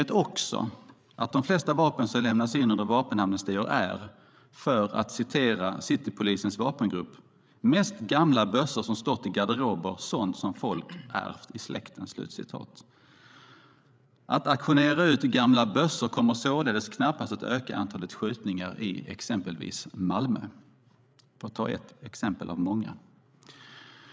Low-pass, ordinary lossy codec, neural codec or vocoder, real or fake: none; none; codec, 16 kHz, 8 kbps, FreqCodec, larger model; fake